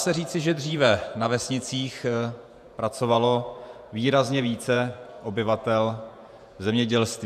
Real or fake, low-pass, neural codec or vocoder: fake; 14.4 kHz; vocoder, 48 kHz, 128 mel bands, Vocos